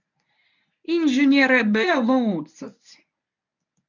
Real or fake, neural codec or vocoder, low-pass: fake; codec, 24 kHz, 0.9 kbps, WavTokenizer, medium speech release version 1; 7.2 kHz